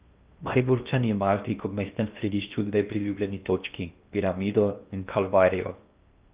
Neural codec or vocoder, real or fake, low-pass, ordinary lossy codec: codec, 16 kHz in and 24 kHz out, 0.8 kbps, FocalCodec, streaming, 65536 codes; fake; 3.6 kHz; Opus, 32 kbps